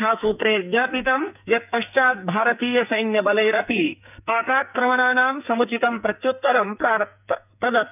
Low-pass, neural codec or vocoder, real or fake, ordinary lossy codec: 3.6 kHz; codec, 44.1 kHz, 2.6 kbps, SNAC; fake; none